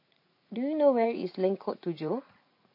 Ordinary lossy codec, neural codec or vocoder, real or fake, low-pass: MP3, 32 kbps; vocoder, 44.1 kHz, 80 mel bands, Vocos; fake; 5.4 kHz